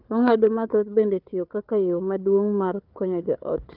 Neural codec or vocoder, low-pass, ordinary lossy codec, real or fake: codec, 16 kHz, 4 kbps, FunCodec, trained on Chinese and English, 50 frames a second; 5.4 kHz; Opus, 24 kbps; fake